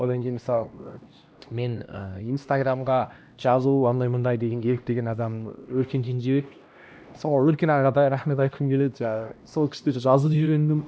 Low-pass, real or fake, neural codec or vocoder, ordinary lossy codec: none; fake; codec, 16 kHz, 1 kbps, X-Codec, HuBERT features, trained on LibriSpeech; none